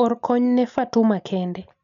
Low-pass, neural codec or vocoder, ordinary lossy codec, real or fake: 7.2 kHz; none; none; real